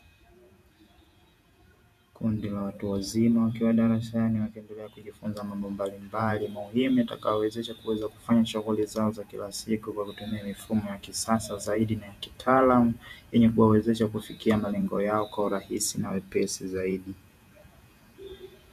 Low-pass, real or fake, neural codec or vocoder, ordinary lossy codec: 14.4 kHz; fake; vocoder, 48 kHz, 128 mel bands, Vocos; MP3, 96 kbps